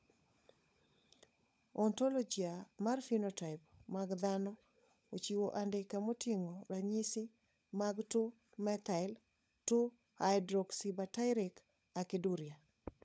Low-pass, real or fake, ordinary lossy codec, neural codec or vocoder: none; fake; none; codec, 16 kHz, 16 kbps, FunCodec, trained on LibriTTS, 50 frames a second